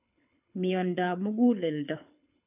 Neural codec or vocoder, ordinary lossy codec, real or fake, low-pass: codec, 24 kHz, 6 kbps, HILCodec; none; fake; 3.6 kHz